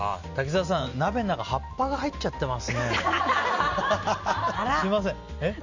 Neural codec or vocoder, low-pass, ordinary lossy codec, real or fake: none; 7.2 kHz; none; real